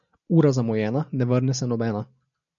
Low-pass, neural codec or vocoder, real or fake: 7.2 kHz; none; real